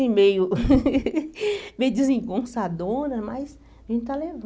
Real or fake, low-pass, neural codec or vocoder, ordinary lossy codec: real; none; none; none